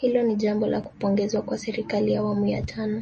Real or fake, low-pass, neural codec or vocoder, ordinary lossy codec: real; 10.8 kHz; none; MP3, 32 kbps